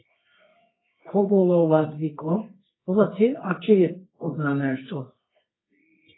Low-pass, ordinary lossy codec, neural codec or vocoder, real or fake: 7.2 kHz; AAC, 16 kbps; codec, 24 kHz, 0.9 kbps, WavTokenizer, medium music audio release; fake